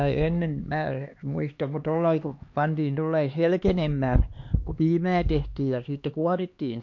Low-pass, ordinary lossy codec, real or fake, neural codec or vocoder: 7.2 kHz; MP3, 48 kbps; fake; codec, 16 kHz, 2 kbps, X-Codec, HuBERT features, trained on LibriSpeech